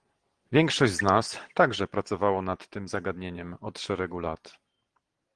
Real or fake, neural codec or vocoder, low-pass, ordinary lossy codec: real; none; 9.9 kHz; Opus, 16 kbps